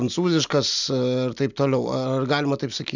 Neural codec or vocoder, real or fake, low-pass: none; real; 7.2 kHz